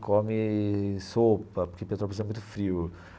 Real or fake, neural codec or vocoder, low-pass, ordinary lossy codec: real; none; none; none